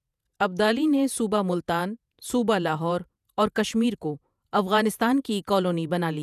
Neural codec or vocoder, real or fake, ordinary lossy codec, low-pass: vocoder, 48 kHz, 128 mel bands, Vocos; fake; none; 14.4 kHz